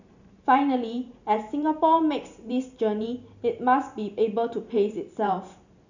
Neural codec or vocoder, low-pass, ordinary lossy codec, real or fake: vocoder, 44.1 kHz, 128 mel bands every 512 samples, BigVGAN v2; 7.2 kHz; none; fake